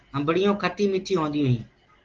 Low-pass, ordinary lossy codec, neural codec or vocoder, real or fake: 7.2 kHz; Opus, 16 kbps; none; real